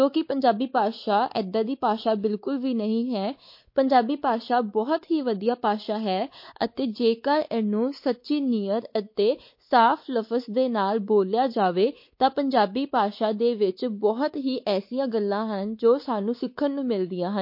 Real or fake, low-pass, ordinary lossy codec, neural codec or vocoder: fake; 5.4 kHz; MP3, 32 kbps; codec, 16 kHz, 4 kbps, X-Codec, WavLM features, trained on Multilingual LibriSpeech